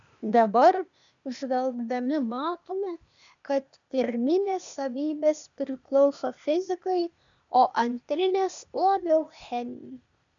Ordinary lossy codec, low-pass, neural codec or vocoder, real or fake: MP3, 96 kbps; 7.2 kHz; codec, 16 kHz, 0.8 kbps, ZipCodec; fake